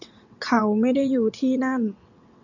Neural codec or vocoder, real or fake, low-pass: vocoder, 22.05 kHz, 80 mel bands, WaveNeXt; fake; 7.2 kHz